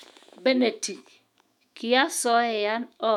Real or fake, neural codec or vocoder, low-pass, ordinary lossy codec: fake; autoencoder, 48 kHz, 128 numbers a frame, DAC-VAE, trained on Japanese speech; 19.8 kHz; none